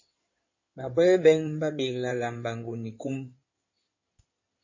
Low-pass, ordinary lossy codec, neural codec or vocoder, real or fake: 7.2 kHz; MP3, 32 kbps; codec, 16 kHz in and 24 kHz out, 2.2 kbps, FireRedTTS-2 codec; fake